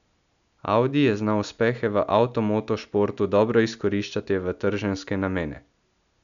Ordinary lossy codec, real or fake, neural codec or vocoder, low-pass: none; real; none; 7.2 kHz